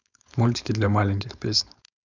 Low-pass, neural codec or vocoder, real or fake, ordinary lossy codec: 7.2 kHz; codec, 16 kHz, 4.8 kbps, FACodec; fake; none